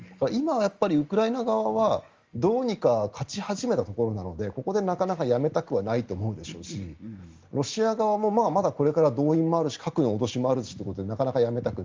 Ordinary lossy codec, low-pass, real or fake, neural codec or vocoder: Opus, 32 kbps; 7.2 kHz; real; none